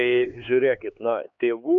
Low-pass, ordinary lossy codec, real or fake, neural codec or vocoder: 7.2 kHz; MP3, 96 kbps; fake; codec, 16 kHz, 4 kbps, X-Codec, HuBERT features, trained on LibriSpeech